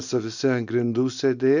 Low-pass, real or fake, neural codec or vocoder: 7.2 kHz; real; none